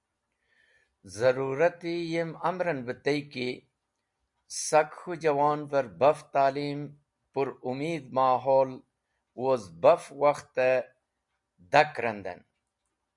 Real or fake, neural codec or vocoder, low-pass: real; none; 10.8 kHz